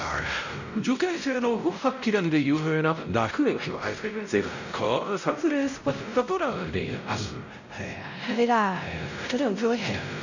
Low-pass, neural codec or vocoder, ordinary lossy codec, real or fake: 7.2 kHz; codec, 16 kHz, 0.5 kbps, X-Codec, WavLM features, trained on Multilingual LibriSpeech; none; fake